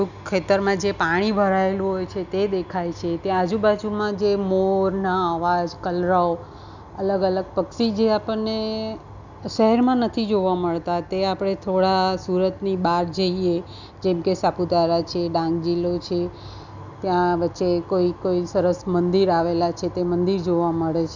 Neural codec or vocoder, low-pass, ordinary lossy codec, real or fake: none; 7.2 kHz; none; real